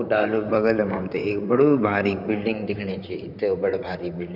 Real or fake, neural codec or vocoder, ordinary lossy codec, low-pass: fake; vocoder, 44.1 kHz, 128 mel bands, Pupu-Vocoder; none; 5.4 kHz